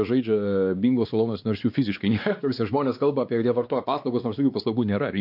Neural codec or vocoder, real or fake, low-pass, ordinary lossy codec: codec, 16 kHz, 2 kbps, X-Codec, WavLM features, trained on Multilingual LibriSpeech; fake; 5.4 kHz; AAC, 48 kbps